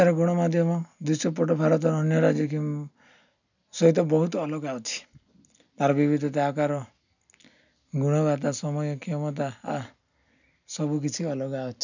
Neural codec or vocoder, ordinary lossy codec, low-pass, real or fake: none; none; 7.2 kHz; real